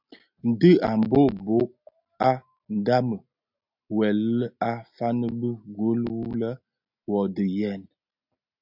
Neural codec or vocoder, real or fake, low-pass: none; real; 5.4 kHz